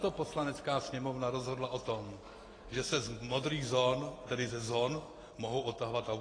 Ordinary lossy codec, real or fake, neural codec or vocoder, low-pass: AAC, 32 kbps; real; none; 9.9 kHz